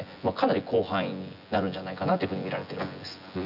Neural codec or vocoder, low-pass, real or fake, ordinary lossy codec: vocoder, 24 kHz, 100 mel bands, Vocos; 5.4 kHz; fake; none